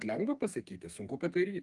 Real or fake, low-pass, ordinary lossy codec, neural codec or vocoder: fake; 10.8 kHz; Opus, 24 kbps; codec, 32 kHz, 1.9 kbps, SNAC